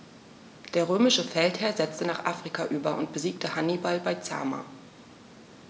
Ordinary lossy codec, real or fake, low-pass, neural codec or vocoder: none; real; none; none